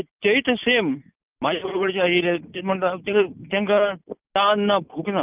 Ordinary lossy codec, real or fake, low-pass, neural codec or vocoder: Opus, 24 kbps; real; 3.6 kHz; none